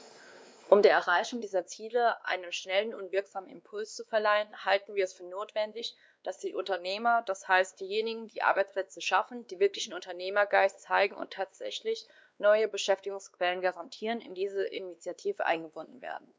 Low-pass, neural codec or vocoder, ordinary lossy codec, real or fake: none; codec, 16 kHz, 2 kbps, X-Codec, WavLM features, trained on Multilingual LibriSpeech; none; fake